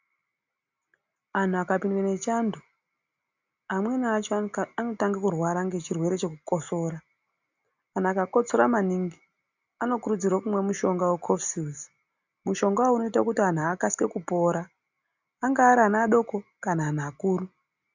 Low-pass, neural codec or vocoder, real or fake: 7.2 kHz; none; real